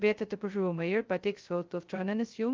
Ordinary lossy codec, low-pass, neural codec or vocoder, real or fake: Opus, 32 kbps; 7.2 kHz; codec, 16 kHz, 0.2 kbps, FocalCodec; fake